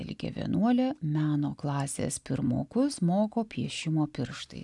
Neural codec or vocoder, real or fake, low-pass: none; real; 10.8 kHz